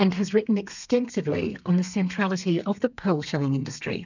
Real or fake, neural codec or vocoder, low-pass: fake; codec, 32 kHz, 1.9 kbps, SNAC; 7.2 kHz